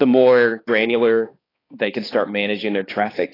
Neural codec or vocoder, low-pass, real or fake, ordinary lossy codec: codec, 16 kHz, 2 kbps, X-Codec, HuBERT features, trained on balanced general audio; 5.4 kHz; fake; AAC, 24 kbps